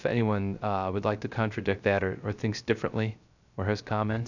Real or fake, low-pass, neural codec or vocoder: fake; 7.2 kHz; codec, 16 kHz, 0.3 kbps, FocalCodec